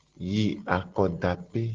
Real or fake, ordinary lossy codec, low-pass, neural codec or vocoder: real; Opus, 16 kbps; 7.2 kHz; none